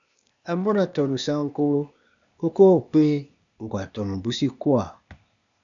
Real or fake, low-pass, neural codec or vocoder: fake; 7.2 kHz; codec, 16 kHz, 0.8 kbps, ZipCodec